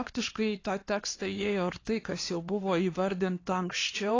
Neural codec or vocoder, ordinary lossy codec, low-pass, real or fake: codec, 16 kHz, 2 kbps, X-Codec, HuBERT features, trained on LibriSpeech; AAC, 32 kbps; 7.2 kHz; fake